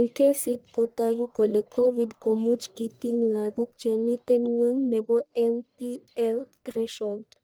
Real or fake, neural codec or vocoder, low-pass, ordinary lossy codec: fake; codec, 44.1 kHz, 1.7 kbps, Pupu-Codec; none; none